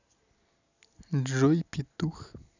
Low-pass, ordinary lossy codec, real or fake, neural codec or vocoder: 7.2 kHz; none; real; none